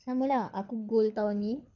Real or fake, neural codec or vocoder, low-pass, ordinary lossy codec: fake; codec, 24 kHz, 6 kbps, HILCodec; 7.2 kHz; none